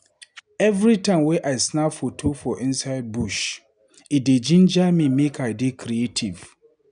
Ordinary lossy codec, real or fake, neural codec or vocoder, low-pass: none; real; none; 9.9 kHz